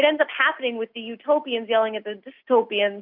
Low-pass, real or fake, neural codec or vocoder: 5.4 kHz; real; none